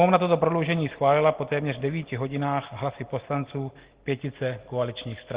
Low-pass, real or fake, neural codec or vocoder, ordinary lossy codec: 3.6 kHz; real; none; Opus, 16 kbps